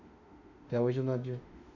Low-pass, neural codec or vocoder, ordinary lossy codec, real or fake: 7.2 kHz; autoencoder, 48 kHz, 32 numbers a frame, DAC-VAE, trained on Japanese speech; none; fake